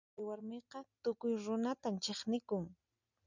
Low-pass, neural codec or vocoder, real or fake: 7.2 kHz; none; real